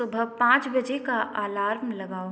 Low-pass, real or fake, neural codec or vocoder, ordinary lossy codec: none; real; none; none